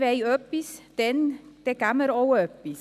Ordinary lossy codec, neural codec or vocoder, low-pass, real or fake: none; none; 14.4 kHz; real